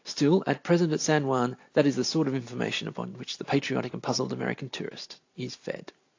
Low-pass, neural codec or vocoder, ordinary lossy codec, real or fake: 7.2 kHz; none; AAC, 48 kbps; real